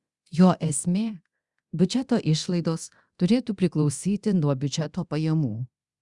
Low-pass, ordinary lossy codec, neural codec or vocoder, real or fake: 10.8 kHz; Opus, 64 kbps; codec, 24 kHz, 0.9 kbps, DualCodec; fake